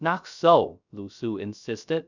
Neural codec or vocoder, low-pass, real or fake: codec, 16 kHz, 0.3 kbps, FocalCodec; 7.2 kHz; fake